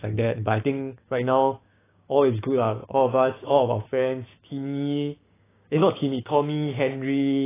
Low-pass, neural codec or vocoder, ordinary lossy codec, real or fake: 3.6 kHz; codec, 16 kHz, 6 kbps, DAC; AAC, 16 kbps; fake